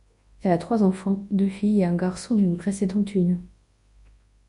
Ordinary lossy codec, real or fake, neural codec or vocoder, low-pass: MP3, 48 kbps; fake; codec, 24 kHz, 0.9 kbps, WavTokenizer, large speech release; 10.8 kHz